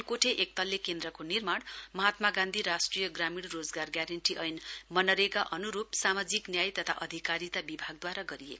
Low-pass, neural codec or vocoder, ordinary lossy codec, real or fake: none; none; none; real